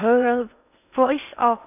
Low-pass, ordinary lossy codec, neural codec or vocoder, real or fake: 3.6 kHz; none; codec, 16 kHz in and 24 kHz out, 0.6 kbps, FocalCodec, streaming, 2048 codes; fake